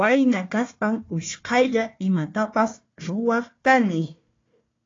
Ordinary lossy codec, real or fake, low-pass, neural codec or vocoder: AAC, 32 kbps; fake; 7.2 kHz; codec, 16 kHz, 1 kbps, FunCodec, trained on Chinese and English, 50 frames a second